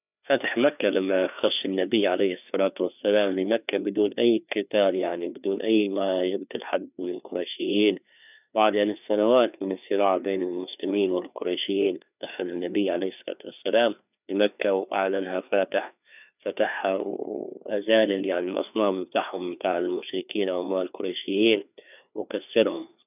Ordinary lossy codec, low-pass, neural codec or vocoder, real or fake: none; 3.6 kHz; codec, 16 kHz, 2 kbps, FreqCodec, larger model; fake